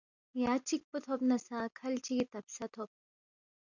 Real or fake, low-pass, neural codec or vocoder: real; 7.2 kHz; none